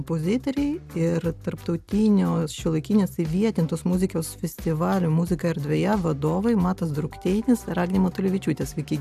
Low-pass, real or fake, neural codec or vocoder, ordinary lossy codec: 14.4 kHz; real; none; AAC, 96 kbps